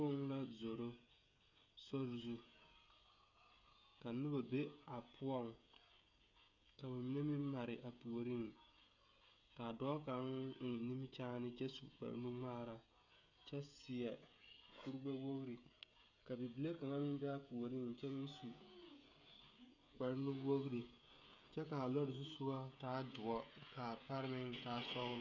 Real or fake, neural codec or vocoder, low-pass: fake; codec, 16 kHz, 16 kbps, FreqCodec, smaller model; 7.2 kHz